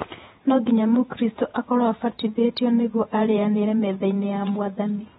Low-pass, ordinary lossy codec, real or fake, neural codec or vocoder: 19.8 kHz; AAC, 16 kbps; fake; vocoder, 48 kHz, 128 mel bands, Vocos